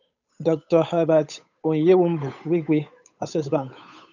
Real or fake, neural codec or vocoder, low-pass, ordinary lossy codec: fake; codec, 16 kHz, 8 kbps, FunCodec, trained on Chinese and English, 25 frames a second; 7.2 kHz; none